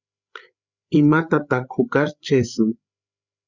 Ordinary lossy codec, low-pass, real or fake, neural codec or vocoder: Opus, 64 kbps; 7.2 kHz; fake; codec, 16 kHz, 8 kbps, FreqCodec, larger model